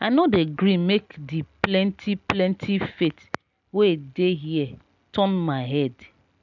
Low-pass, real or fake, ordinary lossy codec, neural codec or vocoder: 7.2 kHz; real; none; none